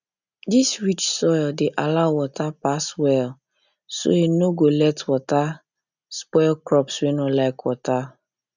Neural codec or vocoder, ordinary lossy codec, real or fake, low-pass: none; none; real; 7.2 kHz